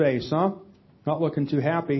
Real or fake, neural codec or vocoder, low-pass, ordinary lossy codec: real; none; 7.2 kHz; MP3, 24 kbps